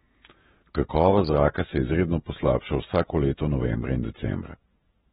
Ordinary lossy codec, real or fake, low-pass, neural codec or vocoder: AAC, 16 kbps; fake; 19.8 kHz; vocoder, 48 kHz, 128 mel bands, Vocos